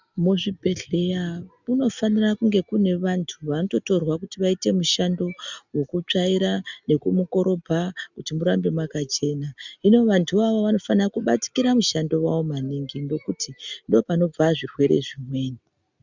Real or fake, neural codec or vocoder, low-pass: real; none; 7.2 kHz